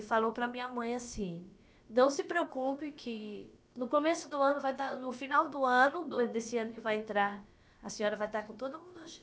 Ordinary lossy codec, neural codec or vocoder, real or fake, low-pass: none; codec, 16 kHz, about 1 kbps, DyCAST, with the encoder's durations; fake; none